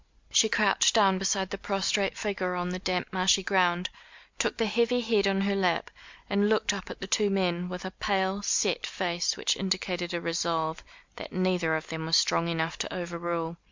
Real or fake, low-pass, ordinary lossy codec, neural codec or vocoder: real; 7.2 kHz; MP3, 64 kbps; none